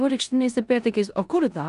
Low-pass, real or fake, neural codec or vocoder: 10.8 kHz; fake; codec, 16 kHz in and 24 kHz out, 0.9 kbps, LongCat-Audio-Codec, four codebook decoder